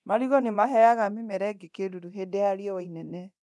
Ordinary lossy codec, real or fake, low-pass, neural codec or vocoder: none; fake; none; codec, 24 kHz, 0.9 kbps, DualCodec